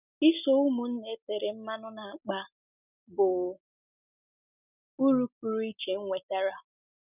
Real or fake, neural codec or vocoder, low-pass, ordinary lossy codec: real; none; 3.6 kHz; none